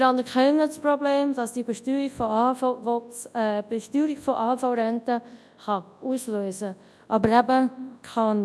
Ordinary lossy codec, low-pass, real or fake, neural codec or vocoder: none; none; fake; codec, 24 kHz, 0.9 kbps, WavTokenizer, large speech release